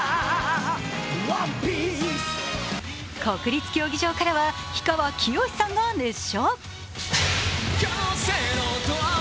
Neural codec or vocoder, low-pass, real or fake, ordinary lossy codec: none; none; real; none